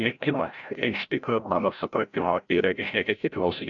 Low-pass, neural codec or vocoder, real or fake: 7.2 kHz; codec, 16 kHz, 0.5 kbps, FreqCodec, larger model; fake